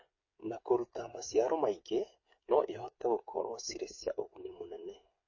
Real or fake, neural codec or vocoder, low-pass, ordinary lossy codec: fake; codec, 16 kHz, 8 kbps, FreqCodec, smaller model; 7.2 kHz; MP3, 32 kbps